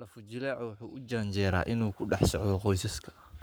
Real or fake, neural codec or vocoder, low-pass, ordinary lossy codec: fake; codec, 44.1 kHz, 7.8 kbps, Pupu-Codec; none; none